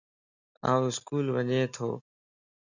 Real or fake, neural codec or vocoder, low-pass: real; none; 7.2 kHz